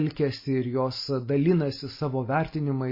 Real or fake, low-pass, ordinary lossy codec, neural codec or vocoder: real; 5.4 kHz; MP3, 24 kbps; none